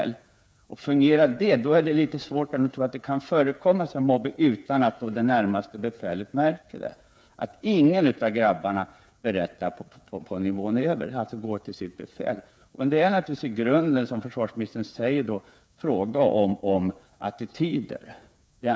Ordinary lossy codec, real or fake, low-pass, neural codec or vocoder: none; fake; none; codec, 16 kHz, 8 kbps, FreqCodec, smaller model